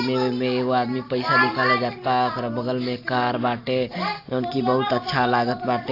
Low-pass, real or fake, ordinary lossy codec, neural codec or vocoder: 5.4 kHz; real; AAC, 32 kbps; none